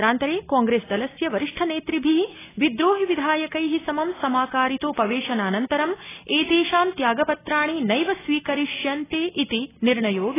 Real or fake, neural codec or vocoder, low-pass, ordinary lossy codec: real; none; 3.6 kHz; AAC, 16 kbps